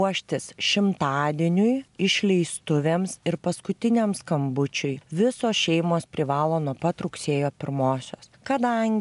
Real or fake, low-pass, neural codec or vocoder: real; 10.8 kHz; none